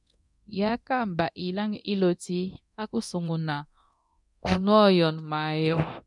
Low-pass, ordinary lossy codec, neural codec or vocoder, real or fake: 10.8 kHz; MP3, 96 kbps; codec, 24 kHz, 0.9 kbps, DualCodec; fake